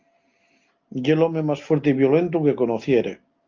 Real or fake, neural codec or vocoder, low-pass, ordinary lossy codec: real; none; 7.2 kHz; Opus, 24 kbps